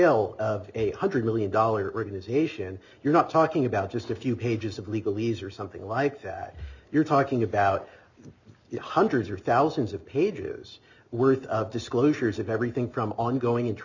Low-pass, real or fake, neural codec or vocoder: 7.2 kHz; real; none